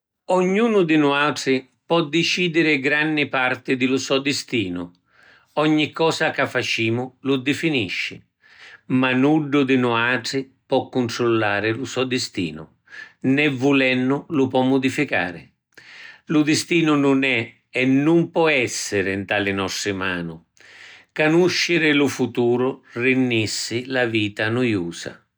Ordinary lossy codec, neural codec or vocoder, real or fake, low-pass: none; none; real; none